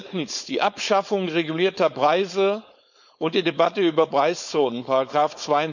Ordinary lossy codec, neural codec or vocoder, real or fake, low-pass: none; codec, 16 kHz, 4.8 kbps, FACodec; fake; 7.2 kHz